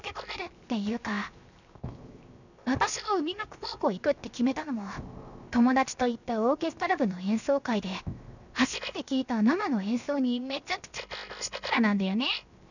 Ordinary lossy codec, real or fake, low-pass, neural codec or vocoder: none; fake; 7.2 kHz; codec, 16 kHz, 0.7 kbps, FocalCodec